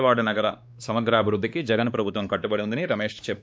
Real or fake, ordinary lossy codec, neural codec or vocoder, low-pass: fake; none; codec, 16 kHz, 2 kbps, X-Codec, WavLM features, trained on Multilingual LibriSpeech; none